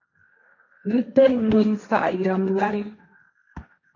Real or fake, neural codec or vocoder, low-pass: fake; codec, 16 kHz, 1.1 kbps, Voila-Tokenizer; 7.2 kHz